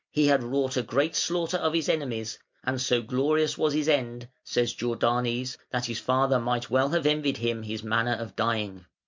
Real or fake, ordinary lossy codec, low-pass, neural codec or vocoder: real; MP3, 64 kbps; 7.2 kHz; none